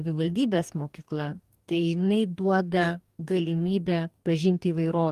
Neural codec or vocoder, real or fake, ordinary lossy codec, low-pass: codec, 44.1 kHz, 2.6 kbps, DAC; fake; Opus, 32 kbps; 14.4 kHz